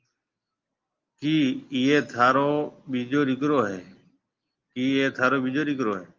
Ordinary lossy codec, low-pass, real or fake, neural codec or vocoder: Opus, 24 kbps; 7.2 kHz; real; none